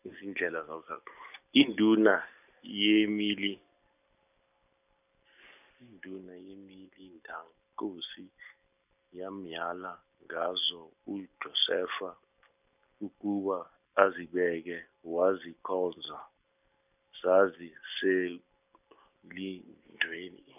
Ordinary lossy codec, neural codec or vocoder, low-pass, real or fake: none; none; 3.6 kHz; real